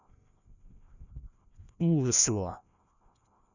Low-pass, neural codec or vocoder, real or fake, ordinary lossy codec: 7.2 kHz; codec, 16 kHz, 1 kbps, FreqCodec, larger model; fake; none